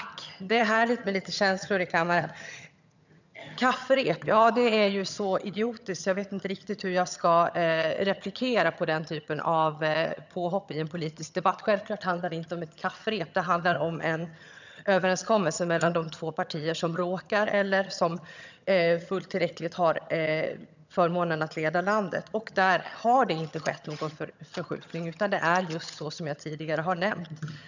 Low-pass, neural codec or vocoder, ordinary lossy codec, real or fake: 7.2 kHz; vocoder, 22.05 kHz, 80 mel bands, HiFi-GAN; none; fake